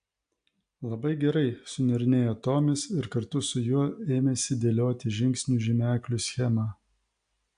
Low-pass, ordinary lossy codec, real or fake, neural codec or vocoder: 9.9 kHz; AAC, 64 kbps; real; none